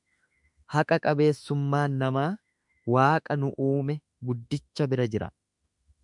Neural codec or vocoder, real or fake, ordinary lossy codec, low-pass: autoencoder, 48 kHz, 32 numbers a frame, DAC-VAE, trained on Japanese speech; fake; MP3, 96 kbps; 10.8 kHz